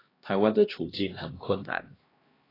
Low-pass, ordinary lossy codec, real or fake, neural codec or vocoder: 5.4 kHz; AAC, 24 kbps; fake; codec, 16 kHz, 1 kbps, X-Codec, HuBERT features, trained on LibriSpeech